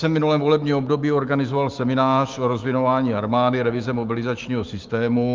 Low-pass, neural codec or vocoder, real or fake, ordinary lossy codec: 7.2 kHz; autoencoder, 48 kHz, 128 numbers a frame, DAC-VAE, trained on Japanese speech; fake; Opus, 24 kbps